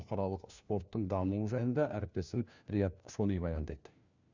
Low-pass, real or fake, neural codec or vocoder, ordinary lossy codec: 7.2 kHz; fake; codec, 16 kHz, 1 kbps, FunCodec, trained on LibriTTS, 50 frames a second; Opus, 64 kbps